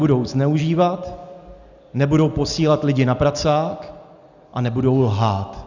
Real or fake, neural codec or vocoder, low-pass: real; none; 7.2 kHz